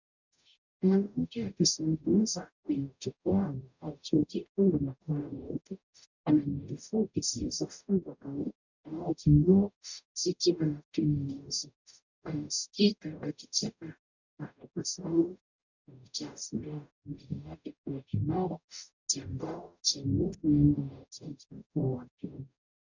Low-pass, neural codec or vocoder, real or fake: 7.2 kHz; codec, 44.1 kHz, 0.9 kbps, DAC; fake